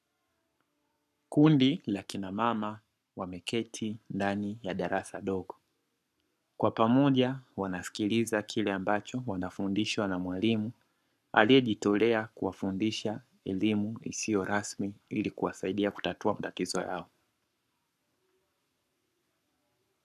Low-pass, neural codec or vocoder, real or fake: 14.4 kHz; codec, 44.1 kHz, 7.8 kbps, Pupu-Codec; fake